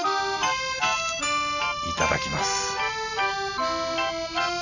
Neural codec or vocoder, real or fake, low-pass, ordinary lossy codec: none; real; 7.2 kHz; none